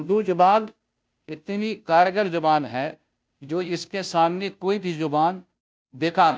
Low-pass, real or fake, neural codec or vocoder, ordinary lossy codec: none; fake; codec, 16 kHz, 0.5 kbps, FunCodec, trained on Chinese and English, 25 frames a second; none